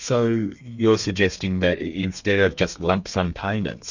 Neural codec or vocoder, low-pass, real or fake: codec, 32 kHz, 1.9 kbps, SNAC; 7.2 kHz; fake